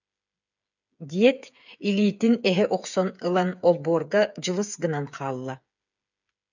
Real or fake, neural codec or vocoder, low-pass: fake; codec, 16 kHz, 8 kbps, FreqCodec, smaller model; 7.2 kHz